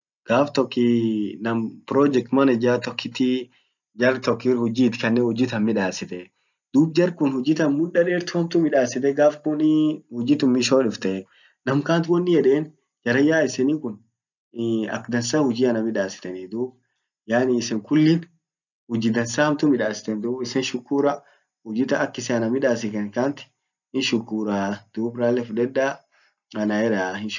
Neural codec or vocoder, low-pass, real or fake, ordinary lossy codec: none; 7.2 kHz; real; none